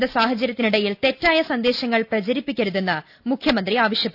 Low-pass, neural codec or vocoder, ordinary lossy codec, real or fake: 5.4 kHz; none; none; real